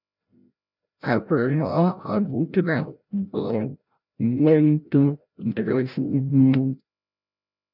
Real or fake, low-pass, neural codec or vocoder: fake; 5.4 kHz; codec, 16 kHz, 0.5 kbps, FreqCodec, larger model